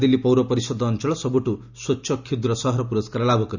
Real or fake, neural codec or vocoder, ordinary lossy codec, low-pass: real; none; none; 7.2 kHz